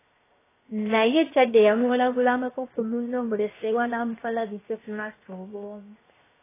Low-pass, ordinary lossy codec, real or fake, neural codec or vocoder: 3.6 kHz; AAC, 16 kbps; fake; codec, 16 kHz, 0.7 kbps, FocalCodec